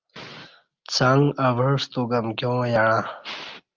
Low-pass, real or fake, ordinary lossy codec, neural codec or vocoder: 7.2 kHz; real; Opus, 24 kbps; none